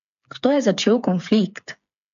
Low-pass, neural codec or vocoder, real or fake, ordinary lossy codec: 7.2 kHz; codec, 16 kHz, 8 kbps, FreqCodec, smaller model; fake; AAC, 96 kbps